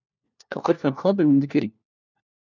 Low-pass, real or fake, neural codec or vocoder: 7.2 kHz; fake; codec, 16 kHz, 1 kbps, FunCodec, trained on LibriTTS, 50 frames a second